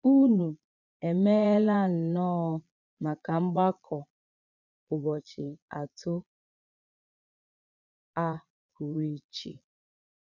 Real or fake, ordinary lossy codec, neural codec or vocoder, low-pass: fake; none; vocoder, 22.05 kHz, 80 mel bands, Vocos; 7.2 kHz